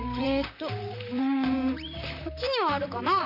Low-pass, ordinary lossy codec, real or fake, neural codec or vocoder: 5.4 kHz; none; fake; vocoder, 44.1 kHz, 128 mel bands, Pupu-Vocoder